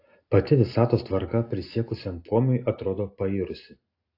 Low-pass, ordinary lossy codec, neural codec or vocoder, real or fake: 5.4 kHz; AAC, 32 kbps; none; real